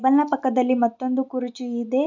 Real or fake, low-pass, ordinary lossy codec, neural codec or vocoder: real; 7.2 kHz; none; none